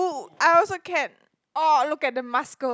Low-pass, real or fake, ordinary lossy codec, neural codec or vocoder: none; real; none; none